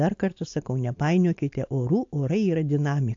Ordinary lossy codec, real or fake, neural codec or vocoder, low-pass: AAC, 48 kbps; real; none; 7.2 kHz